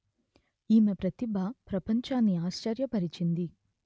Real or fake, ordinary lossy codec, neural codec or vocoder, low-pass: real; none; none; none